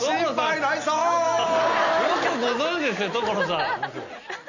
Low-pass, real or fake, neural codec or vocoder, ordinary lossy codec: 7.2 kHz; real; none; none